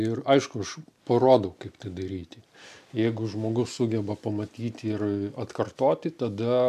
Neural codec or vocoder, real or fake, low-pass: none; real; 14.4 kHz